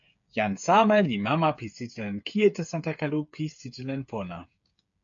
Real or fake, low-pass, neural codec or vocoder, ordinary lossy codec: fake; 7.2 kHz; codec, 16 kHz, 8 kbps, FreqCodec, smaller model; AAC, 64 kbps